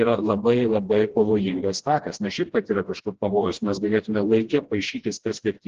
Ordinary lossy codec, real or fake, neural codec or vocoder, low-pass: Opus, 16 kbps; fake; codec, 16 kHz, 1 kbps, FreqCodec, smaller model; 7.2 kHz